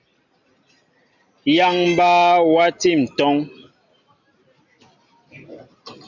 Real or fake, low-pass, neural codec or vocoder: real; 7.2 kHz; none